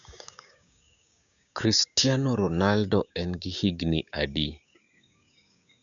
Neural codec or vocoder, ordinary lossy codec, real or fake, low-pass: codec, 16 kHz, 6 kbps, DAC; none; fake; 7.2 kHz